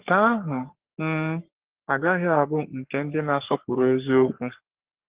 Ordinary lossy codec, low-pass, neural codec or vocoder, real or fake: Opus, 16 kbps; 3.6 kHz; codec, 44.1 kHz, 3.4 kbps, Pupu-Codec; fake